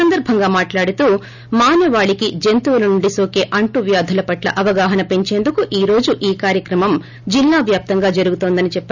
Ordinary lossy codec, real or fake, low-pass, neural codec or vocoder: none; real; 7.2 kHz; none